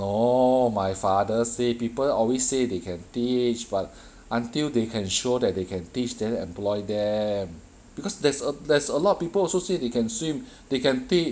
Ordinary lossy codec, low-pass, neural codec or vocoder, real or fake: none; none; none; real